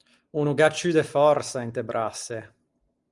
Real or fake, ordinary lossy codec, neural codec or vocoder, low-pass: real; Opus, 32 kbps; none; 10.8 kHz